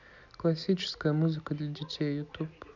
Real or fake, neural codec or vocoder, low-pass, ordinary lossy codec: real; none; 7.2 kHz; none